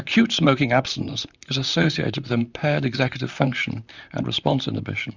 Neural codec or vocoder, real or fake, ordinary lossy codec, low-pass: vocoder, 44.1 kHz, 128 mel bands, Pupu-Vocoder; fake; Opus, 64 kbps; 7.2 kHz